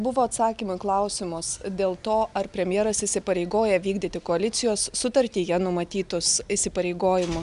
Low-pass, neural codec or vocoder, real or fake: 10.8 kHz; none; real